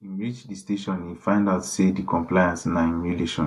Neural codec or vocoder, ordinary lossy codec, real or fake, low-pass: none; AAC, 96 kbps; real; 14.4 kHz